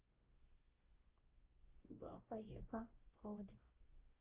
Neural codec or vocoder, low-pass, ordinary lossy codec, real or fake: codec, 16 kHz, 0.5 kbps, X-Codec, WavLM features, trained on Multilingual LibriSpeech; 3.6 kHz; Opus, 16 kbps; fake